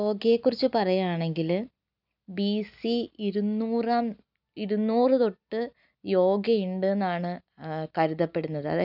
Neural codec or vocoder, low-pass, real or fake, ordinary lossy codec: none; 5.4 kHz; real; none